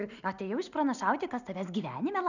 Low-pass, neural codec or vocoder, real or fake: 7.2 kHz; none; real